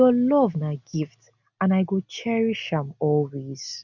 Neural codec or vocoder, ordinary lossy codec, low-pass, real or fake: none; none; 7.2 kHz; real